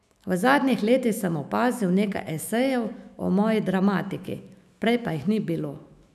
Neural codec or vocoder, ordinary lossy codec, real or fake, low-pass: autoencoder, 48 kHz, 128 numbers a frame, DAC-VAE, trained on Japanese speech; none; fake; 14.4 kHz